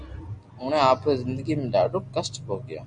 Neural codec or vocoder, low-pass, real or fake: none; 9.9 kHz; real